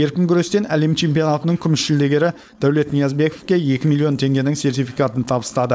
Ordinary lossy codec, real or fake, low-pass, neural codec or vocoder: none; fake; none; codec, 16 kHz, 4.8 kbps, FACodec